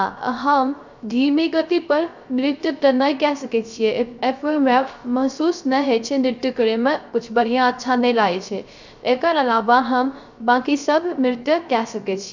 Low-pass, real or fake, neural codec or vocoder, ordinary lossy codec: 7.2 kHz; fake; codec, 16 kHz, 0.3 kbps, FocalCodec; none